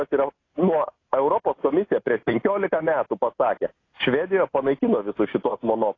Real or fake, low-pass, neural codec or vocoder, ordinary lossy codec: real; 7.2 kHz; none; AAC, 32 kbps